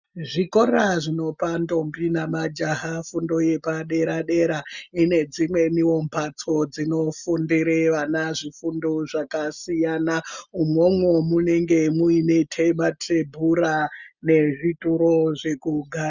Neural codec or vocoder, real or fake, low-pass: none; real; 7.2 kHz